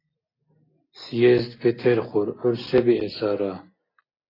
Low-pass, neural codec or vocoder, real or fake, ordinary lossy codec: 5.4 kHz; none; real; AAC, 24 kbps